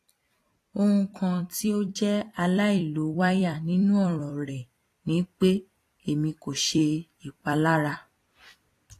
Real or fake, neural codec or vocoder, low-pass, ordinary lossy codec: fake; vocoder, 44.1 kHz, 128 mel bands every 256 samples, BigVGAN v2; 14.4 kHz; AAC, 48 kbps